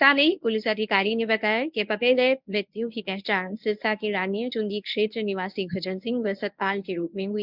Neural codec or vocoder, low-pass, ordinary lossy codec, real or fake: codec, 24 kHz, 0.9 kbps, WavTokenizer, medium speech release version 1; 5.4 kHz; none; fake